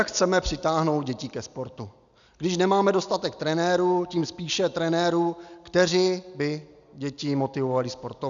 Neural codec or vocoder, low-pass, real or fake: none; 7.2 kHz; real